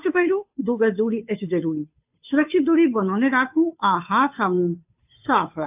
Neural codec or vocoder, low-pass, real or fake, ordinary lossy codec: codec, 16 kHz, 2 kbps, FunCodec, trained on Chinese and English, 25 frames a second; 3.6 kHz; fake; AAC, 32 kbps